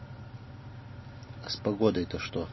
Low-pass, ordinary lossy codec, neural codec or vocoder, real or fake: 7.2 kHz; MP3, 24 kbps; none; real